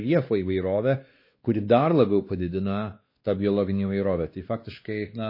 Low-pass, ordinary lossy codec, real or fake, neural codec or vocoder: 5.4 kHz; MP3, 24 kbps; fake; codec, 16 kHz, 2 kbps, X-Codec, WavLM features, trained on Multilingual LibriSpeech